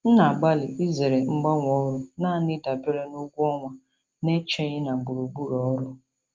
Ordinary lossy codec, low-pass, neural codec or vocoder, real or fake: Opus, 24 kbps; 7.2 kHz; none; real